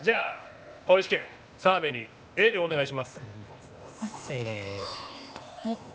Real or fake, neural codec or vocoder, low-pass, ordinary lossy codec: fake; codec, 16 kHz, 0.8 kbps, ZipCodec; none; none